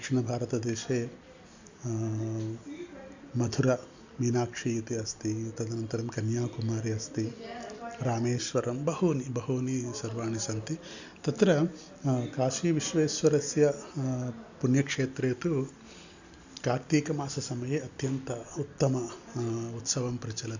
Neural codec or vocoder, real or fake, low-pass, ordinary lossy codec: none; real; 7.2 kHz; Opus, 64 kbps